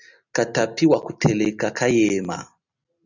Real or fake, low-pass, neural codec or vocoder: real; 7.2 kHz; none